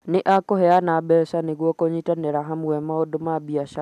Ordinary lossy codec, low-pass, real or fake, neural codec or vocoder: none; 14.4 kHz; real; none